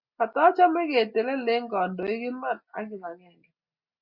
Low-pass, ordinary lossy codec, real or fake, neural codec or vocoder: 5.4 kHz; Opus, 64 kbps; real; none